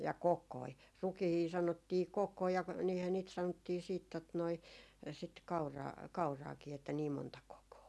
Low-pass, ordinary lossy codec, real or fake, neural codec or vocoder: none; none; real; none